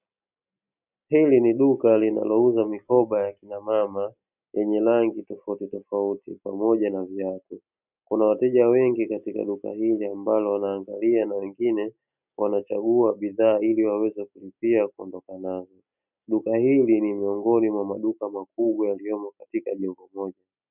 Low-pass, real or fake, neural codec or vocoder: 3.6 kHz; real; none